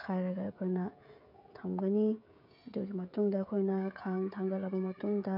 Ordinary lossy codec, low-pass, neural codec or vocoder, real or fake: none; 5.4 kHz; codec, 16 kHz, 16 kbps, FreqCodec, smaller model; fake